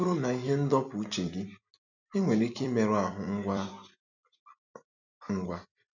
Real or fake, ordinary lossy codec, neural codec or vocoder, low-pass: fake; none; vocoder, 44.1 kHz, 128 mel bands every 256 samples, BigVGAN v2; 7.2 kHz